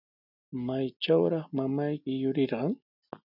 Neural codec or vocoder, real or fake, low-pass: none; real; 5.4 kHz